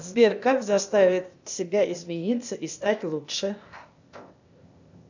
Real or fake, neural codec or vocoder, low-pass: fake; codec, 16 kHz, 0.8 kbps, ZipCodec; 7.2 kHz